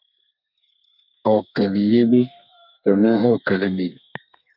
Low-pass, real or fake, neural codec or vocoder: 5.4 kHz; fake; codec, 32 kHz, 1.9 kbps, SNAC